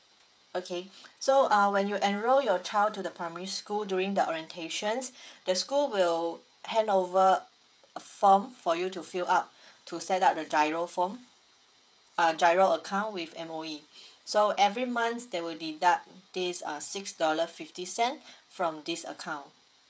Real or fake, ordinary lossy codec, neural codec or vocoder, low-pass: fake; none; codec, 16 kHz, 16 kbps, FreqCodec, smaller model; none